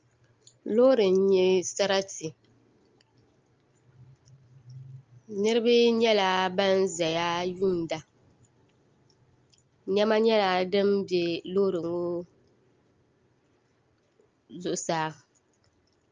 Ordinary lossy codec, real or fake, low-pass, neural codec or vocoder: Opus, 24 kbps; real; 7.2 kHz; none